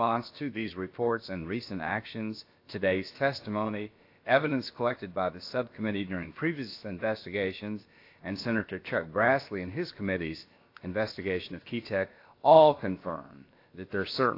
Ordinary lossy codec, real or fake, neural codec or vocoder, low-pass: AAC, 32 kbps; fake; codec, 16 kHz, about 1 kbps, DyCAST, with the encoder's durations; 5.4 kHz